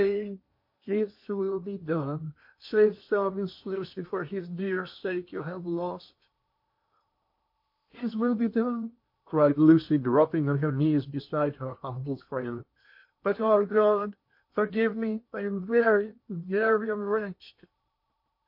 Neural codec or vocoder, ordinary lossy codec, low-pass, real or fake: codec, 16 kHz in and 24 kHz out, 0.8 kbps, FocalCodec, streaming, 65536 codes; MP3, 32 kbps; 5.4 kHz; fake